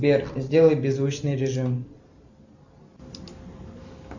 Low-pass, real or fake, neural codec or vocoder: 7.2 kHz; real; none